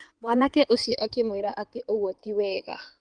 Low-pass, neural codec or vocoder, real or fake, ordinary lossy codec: 9.9 kHz; codec, 16 kHz in and 24 kHz out, 2.2 kbps, FireRedTTS-2 codec; fake; Opus, 24 kbps